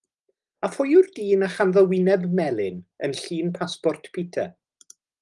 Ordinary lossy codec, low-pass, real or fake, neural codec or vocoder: Opus, 24 kbps; 10.8 kHz; real; none